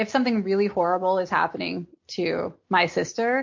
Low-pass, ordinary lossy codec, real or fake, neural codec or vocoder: 7.2 kHz; MP3, 48 kbps; real; none